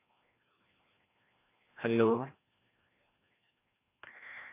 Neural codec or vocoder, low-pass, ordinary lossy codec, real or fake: codec, 16 kHz, 1 kbps, FreqCodec, larger model; 3.6 kHz; AAC, 24 kbps; fake